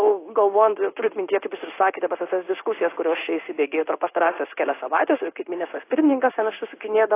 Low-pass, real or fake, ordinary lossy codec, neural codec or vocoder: 3.6 kHz; fake; AAC, 24 kbps; codec, 16 kHz in and 24 kHz out, 1 kbps, XY-Tokenizer